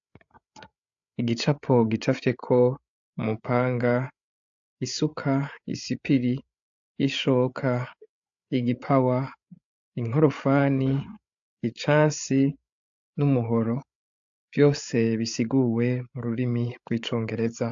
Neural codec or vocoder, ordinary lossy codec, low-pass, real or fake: codec, 16 kHz, 8 kbps, FreqCodec, larger model; AAC, 64 kbps; 7.2 kHz; fake